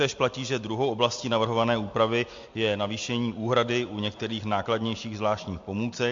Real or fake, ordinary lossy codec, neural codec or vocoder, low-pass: real; MP3, 48 kbps; none; 7.2 kHz